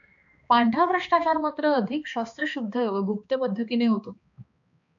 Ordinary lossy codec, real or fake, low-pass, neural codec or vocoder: AAC, 64 kbps; fake; 7.2 kHz; codec, 16 kHz, 2 kbps, X-Codec, HuBERT features, trained on balanced general audio